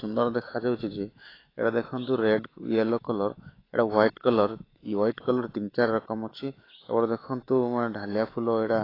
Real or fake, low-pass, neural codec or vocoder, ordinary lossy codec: fake; 5.4 kHz; autoencoder, 48 kHz, 128 numbers a frame, DAC-VAE, trained on Japanese speech; AAC, 24 kbps